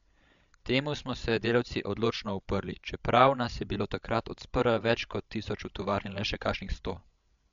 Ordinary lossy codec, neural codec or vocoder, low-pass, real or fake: MP3, 64 kbps; codec, 16 kHz, 16 kbps, FreqCodec, larger model; 7.2 kHz; fake